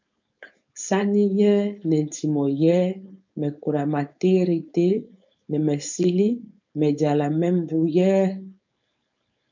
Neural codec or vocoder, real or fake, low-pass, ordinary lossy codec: codec, 16 kHz, 4.8 kbps, FACodec; fake; 7.2 kHz; MP3, 64 kbps